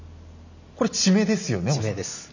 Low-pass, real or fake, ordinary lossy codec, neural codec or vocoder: 7.2 kHz; real; none; none